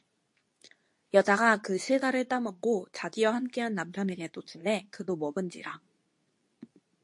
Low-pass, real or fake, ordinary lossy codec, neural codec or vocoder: 10.8 kHz; fake; MP3, 48 kbps; codec, 24 kHz, 0.9 kbps, WavTokenizer, medium speech release version 2